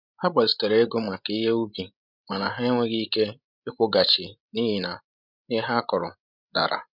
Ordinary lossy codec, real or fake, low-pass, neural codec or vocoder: none; fake; 5.4 kHz; codec, 16 kHz, 16 kbps, FreqCodec, larger model